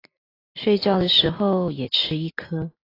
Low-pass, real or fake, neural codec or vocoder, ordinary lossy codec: 5.4 kHz; real; none; AAC, 24 kbps